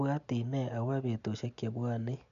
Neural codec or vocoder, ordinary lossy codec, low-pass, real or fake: none; none; 7.2 kHz; real